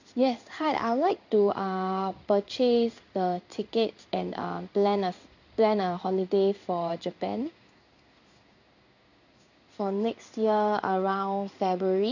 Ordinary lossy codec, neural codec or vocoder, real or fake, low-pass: none; codec, 16 kHz in and 24 kHz out, 1 kbps, XY-Tokenizer; fake; 7.2 kHz